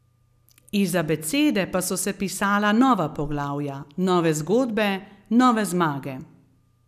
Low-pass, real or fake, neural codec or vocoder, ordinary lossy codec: 14.4 kHz; real; none; none